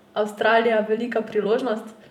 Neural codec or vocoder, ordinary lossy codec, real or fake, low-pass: vocoder, 44.1 kHz, 128 mel bands every 256 samples, BigVGAN v2; none; fake; 19.8 kHz